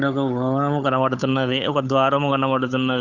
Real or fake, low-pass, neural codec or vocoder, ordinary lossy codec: fake; 7.2 kHz; codec, 16 kHz, 8 kbps, FunCodec, trained on Chinese and English, 25 frames a second; none